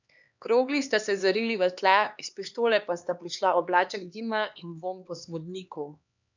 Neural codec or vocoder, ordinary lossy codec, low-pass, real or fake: codec, 16 kHz, 2 kbps, X-Codec, HuBERT features, trained on LibriSpeech; none; 7.2 kHz; fake